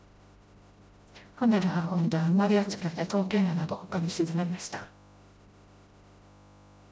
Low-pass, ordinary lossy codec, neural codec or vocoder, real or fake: none; none; codec, 16 kHz, 0.5 kbps, FreqCodec, smaller model; fake